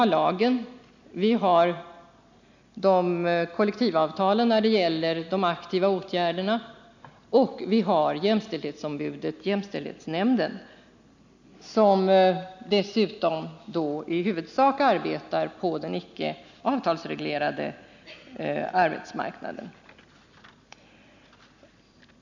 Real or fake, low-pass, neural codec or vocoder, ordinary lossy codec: real; 7.2 kHz; none; none